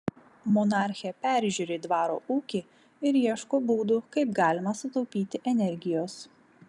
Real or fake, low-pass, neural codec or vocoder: real; 10.8 kHz; none